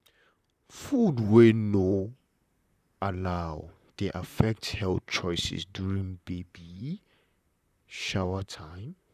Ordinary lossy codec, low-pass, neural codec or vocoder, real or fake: none; 14.4 kHz; vocoder, 44.1 kHz, 128 mel bands, Pupu-Vocoder; fake